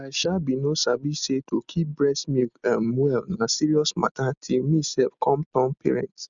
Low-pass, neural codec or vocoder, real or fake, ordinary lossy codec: 7.2 kHz; none; real; none